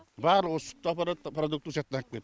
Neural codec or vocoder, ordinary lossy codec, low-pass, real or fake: none; none; none; real